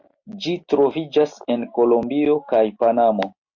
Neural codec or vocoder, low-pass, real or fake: none; 7.2 kHz; real